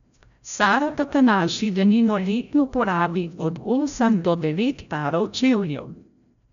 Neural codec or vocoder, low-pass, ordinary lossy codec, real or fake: codec, 16 kHz, 0.5 kbps, FreqCodec, larger model; 7.2 kHz; none; fake